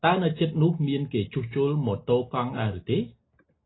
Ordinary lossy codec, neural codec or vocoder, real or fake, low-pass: AAC, 16 kbps; none; real; 7.2 kHz